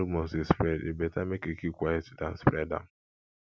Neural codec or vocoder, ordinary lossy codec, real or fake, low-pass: none; none; real; none